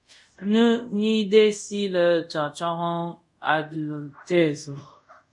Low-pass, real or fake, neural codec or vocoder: 10.8 kHz; fake; codec, 24 kHz, 0.5 kbps, DualCodec